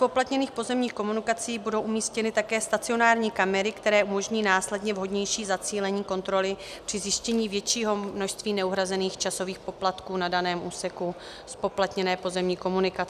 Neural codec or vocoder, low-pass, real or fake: none; 14.4 kHz; real